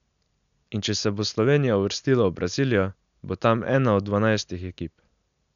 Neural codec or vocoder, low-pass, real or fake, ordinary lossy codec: none; 7.2 kHz; real; none